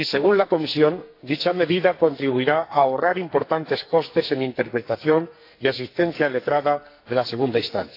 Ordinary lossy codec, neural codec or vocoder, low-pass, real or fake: AAC, 32 kbps; codec, 44.1 kHz, 2.6 kbps, SNAC; 5.4 kHz; fake